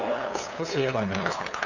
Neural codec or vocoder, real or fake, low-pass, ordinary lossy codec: codec, 16 kHz, 2 kbps, FunCodec, trained on LibriTTS, 25 frames a second; fake; 7.2 kHz; none